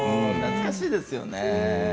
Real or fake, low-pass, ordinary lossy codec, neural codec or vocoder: real; none; none; none